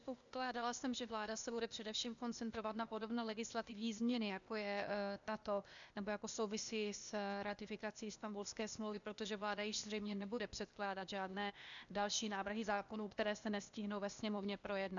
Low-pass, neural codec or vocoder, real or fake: 7.2 kHz; codec, 16 kHz, 0.8 kbps, ZipCodec; fake